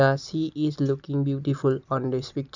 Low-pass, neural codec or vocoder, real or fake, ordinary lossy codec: 7.2 kHz; none; real; none